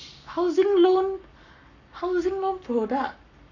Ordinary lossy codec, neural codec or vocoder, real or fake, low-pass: none; codec, 44.1 kHz, 7.8 kbps, Pupu-Codec; fake; 7.2 kHz